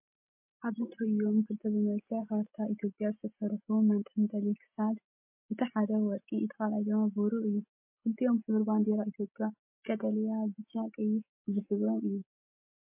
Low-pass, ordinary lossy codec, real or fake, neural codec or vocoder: 3.6 kHz; MP3, 32 kbps; real; none